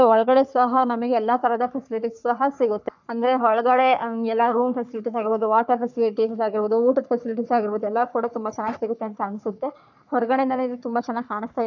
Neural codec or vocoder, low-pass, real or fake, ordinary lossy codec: codec, 44.1 kHz, 3.4 kbps, Pupu-Codec; 7.2 kHz; fake; none